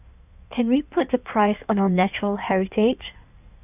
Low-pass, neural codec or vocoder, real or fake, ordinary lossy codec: 3.6 kHz; codec, 16 kHz in and 24 kHz out, 1.1 kbps, FireRedTTS-2 codec; fake; none